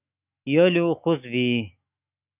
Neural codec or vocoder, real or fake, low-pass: autoencoder, 48 kHz, 128 numbers a frame, DAC-VAE, trained on Japanese speech; fake; 3.6 kHz